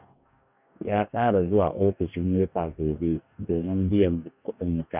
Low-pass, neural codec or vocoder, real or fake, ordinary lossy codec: 3.6 kHz; codec, 44.1 kHz, 2.6 kbps, DAC; fake; none